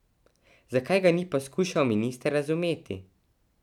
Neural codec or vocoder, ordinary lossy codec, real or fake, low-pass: none; none; real; 19.8 kHz